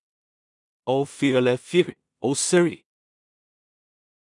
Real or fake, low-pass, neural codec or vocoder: fake; 10.8 kHz; codec, 16 kHz in and 24 kHz out, 0.4 kbps, LongCat-Audio-Codec, two codebook decoder